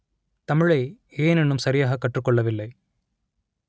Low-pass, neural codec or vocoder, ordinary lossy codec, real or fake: none; none; none; real